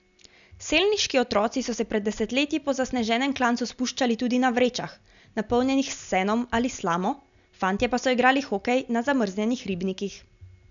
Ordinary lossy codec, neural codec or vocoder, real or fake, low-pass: none; none; real; 7.2 kHz